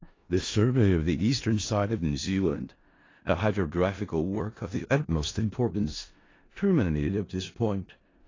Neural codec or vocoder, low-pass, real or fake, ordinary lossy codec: codec, 16 kHz in and 24 kHz out, 0.4 kbps, LongCat-Audio-Codec, four codebook decoder; 7.2 kHz; fake; AAC, 32 kbps